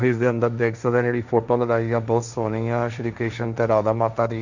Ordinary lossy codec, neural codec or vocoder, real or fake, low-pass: none; codec, 16 kHz, 1.1 kbps, Voila-Tokenizer; fake; 7.2 kHz